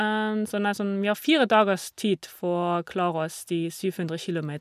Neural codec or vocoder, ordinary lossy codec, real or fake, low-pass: none; none; real; 14.4 kHz